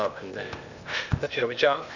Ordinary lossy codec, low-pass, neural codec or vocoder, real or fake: none; 7.2 kHz; codec, 16 kHz, 0.8 kbps, ZipCodec; fake